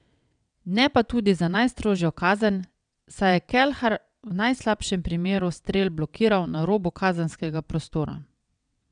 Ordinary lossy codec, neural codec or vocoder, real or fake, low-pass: none; vocoder, 22.05 kHz, 80 mel bands, WaveNeXt; fake; 9.9 kHz